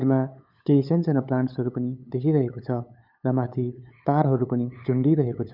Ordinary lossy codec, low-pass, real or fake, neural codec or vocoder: none; 5.4 kHz; fake; codec, 16 kHz, 8 kbps, FunCodec, trained on LibriTTS, 25 frames a second